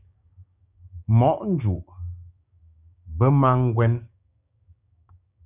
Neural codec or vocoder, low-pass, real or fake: codec, 16 kHz, 6 kbps, DAC; 3.6 kHz; fake